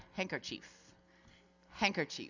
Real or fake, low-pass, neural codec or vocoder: real; 7.2 kHz; none